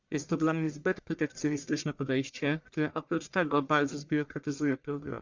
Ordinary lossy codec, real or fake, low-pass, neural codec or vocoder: Opus, 64 kbps; fake; 7.2 kHz; codec, 44.1 kHz, 1.7 kbps, Pupu-Codec